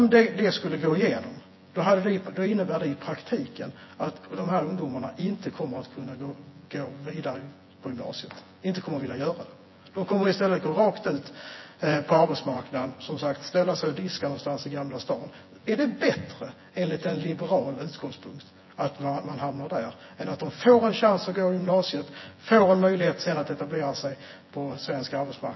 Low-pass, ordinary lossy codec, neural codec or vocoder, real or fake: 7.2 kHz; MP3, 24 kbps; vocoder, 24 kHz, 100 mel bands, Vocos; fake